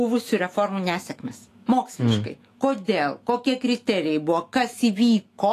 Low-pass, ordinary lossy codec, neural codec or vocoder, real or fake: 14.4 kHz; AAC, 48 kbps; autoencoder, 48 kHz, 128 numbers a frame, DAC-VAE, trained on Japanese speech; fake